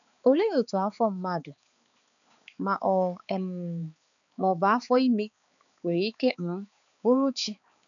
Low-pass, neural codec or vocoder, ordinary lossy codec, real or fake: 7.2 kHz; codec, 16 kHz, 4 kbps, X-Codec, HuBERT features, trained on balanced general audio; none; fake